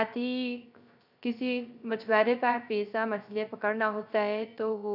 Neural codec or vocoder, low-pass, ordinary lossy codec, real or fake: codec, 16 kHz, 0.3 kbps, FocalCodec; 5.4 kHz; none; fake